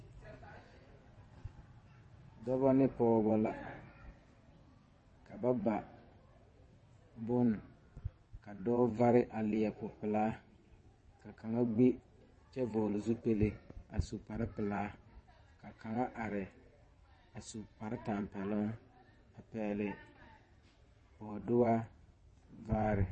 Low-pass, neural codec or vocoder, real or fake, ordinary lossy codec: 9.9 kHz; vocoder, 22.05 kHz, 80 mel bands, WaveNeXt; fake; MP3, 32 kbps